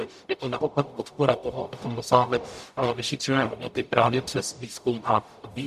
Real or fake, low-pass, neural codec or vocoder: fake; 14.4 kHz; codec, 44.1 kHz, 0.9 kbps, DAC